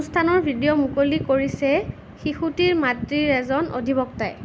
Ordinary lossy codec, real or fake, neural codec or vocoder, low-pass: none; real; none; none